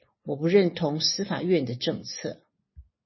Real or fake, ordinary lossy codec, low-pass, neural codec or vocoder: real; MP3, 24 kbps; 7.2 kHz; none